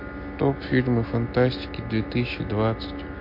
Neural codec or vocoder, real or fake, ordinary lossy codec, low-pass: none; real; MP3, 32 kbps; 5.4 kHz